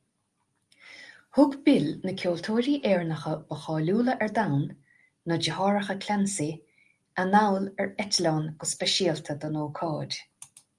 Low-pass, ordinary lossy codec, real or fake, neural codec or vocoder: 10.8 kHz; Opus, 32 kbps; real; none